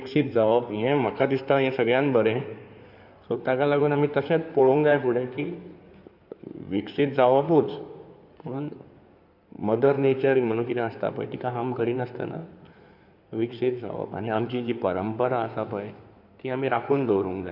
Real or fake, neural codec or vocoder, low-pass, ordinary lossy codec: fake; codec, 16 kHz in and 24 kHz out, 2.2 kbps, FireRedTTS-2 codec; 5.4 kHz; none